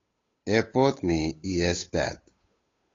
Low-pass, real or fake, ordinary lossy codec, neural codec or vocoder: 7.2 kHz; fake; AAC, 32 kbps; codec, 16 kHz, 8 kbps, FunCodec, trained on Chinese and English, 25 frames a second